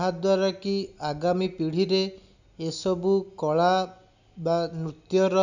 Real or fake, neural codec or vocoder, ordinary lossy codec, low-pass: real; none; none; 7.2 kHz